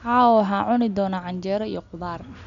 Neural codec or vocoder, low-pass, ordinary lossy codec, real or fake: none; 7.2 kHz; none; real